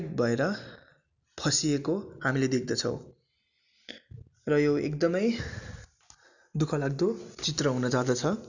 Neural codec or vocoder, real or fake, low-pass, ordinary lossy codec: none; real; 7.2 kHz; none